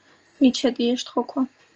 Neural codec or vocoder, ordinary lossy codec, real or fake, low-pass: none; Opus, 24 kbps; real; 7.2 kHz